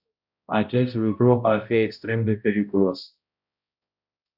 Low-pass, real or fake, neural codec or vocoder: 5.4 kHz; fake; codec, 16 kHz, 0.5 kbps, X-Codec, HuBERT features, trained on balanced general audio